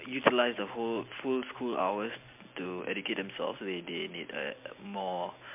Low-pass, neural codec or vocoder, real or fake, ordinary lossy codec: 3.6 kHz; none; real; MP3, 32 kbps